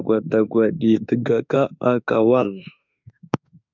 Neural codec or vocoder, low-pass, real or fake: autoencoder, 48 kHz, 32 numbers a frame, DAC-VAE, trained on Japanese speech; 7.2 kHz; fake